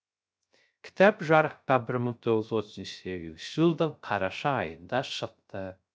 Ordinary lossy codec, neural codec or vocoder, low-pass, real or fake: none; codec, 16 kHz, 0.3 kbps, FocalCodec; none; fake